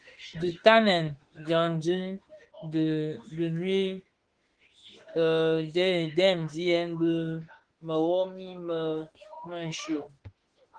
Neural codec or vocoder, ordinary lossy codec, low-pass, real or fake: autoencoder, 48 kHz, 32 numbers a frame, DAC-VAE, trained on Japanese speech; Opus, 16 kbps; 9.9 kHz; fake